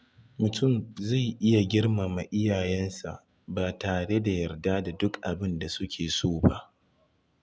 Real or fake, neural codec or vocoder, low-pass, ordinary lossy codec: real; none; none; none